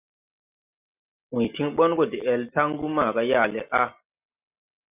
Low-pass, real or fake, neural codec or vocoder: 3.6 kHz; real; none